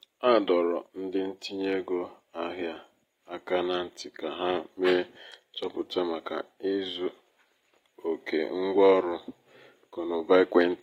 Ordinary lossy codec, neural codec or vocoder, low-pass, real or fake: AAC, 48 kbps; none; 19.8 kHz; real